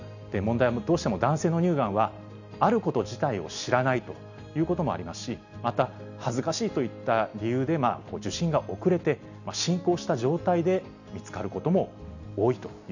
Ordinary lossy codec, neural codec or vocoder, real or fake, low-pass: none; none; real; 7.2 kHz